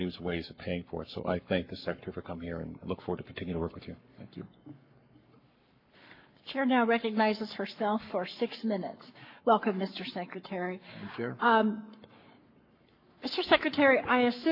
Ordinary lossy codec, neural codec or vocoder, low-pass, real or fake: MP3, 32 kbps; codec, 44.1 kHz, 7.8 kbps, Pupu-Codec; 5.4 kHz; fake